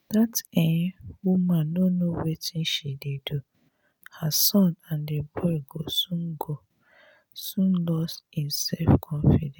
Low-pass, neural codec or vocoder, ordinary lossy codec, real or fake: none; none; none; real